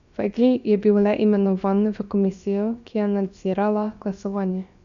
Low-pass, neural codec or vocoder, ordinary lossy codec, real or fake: 7.2 kHz; codec, 16 kHz, about 1 kbps, DyCAST, with the encoder's durations; AAC, 96 kbps; fake